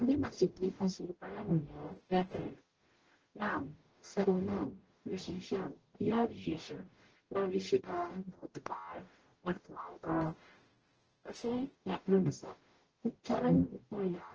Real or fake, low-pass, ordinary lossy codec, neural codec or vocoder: fake; 7.2 kHz; Opus, 16 kbps; codec, 44.1 kHz, 0.9 kbps, DAC